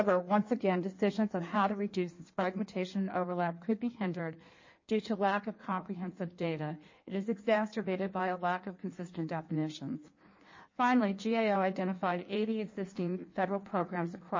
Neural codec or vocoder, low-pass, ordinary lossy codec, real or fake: codec, 16 kHz in and 24 kHz out, 1.1 kbps, FireRedTTS-2 codec; 7.2 kHz; MP3, 32 kbps; fake